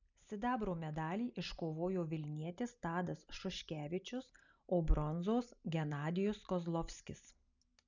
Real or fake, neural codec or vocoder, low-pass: real; none; 7.2 kHz